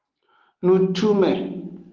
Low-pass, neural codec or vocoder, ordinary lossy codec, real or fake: 7.2 kHz; none; Opus, 16 kbps; real